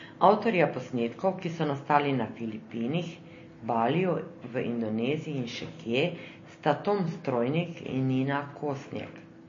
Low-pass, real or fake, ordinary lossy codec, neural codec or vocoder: 7.2 kHz; real; MP3, 32 kbps; none